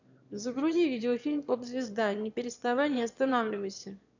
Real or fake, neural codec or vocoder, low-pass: fake; autoencoder, 22.05 kHz, a latent of 192 numbers a frame, VITS, trained on one speaker; 7.2 kHz